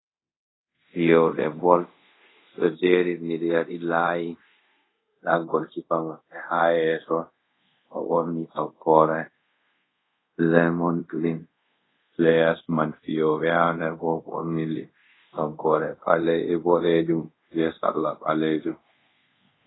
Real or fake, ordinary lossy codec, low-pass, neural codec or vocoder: fake; AAC, 16 kbps; 7.2 kHz; codec, 24 kHz, 0.5 kbps, DualCodec